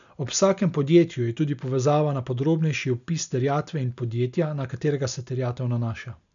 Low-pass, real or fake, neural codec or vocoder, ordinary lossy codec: 7.2 kHz; real; none; none